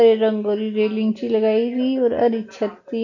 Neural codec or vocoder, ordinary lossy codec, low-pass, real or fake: none; none; 7.2 kHz; real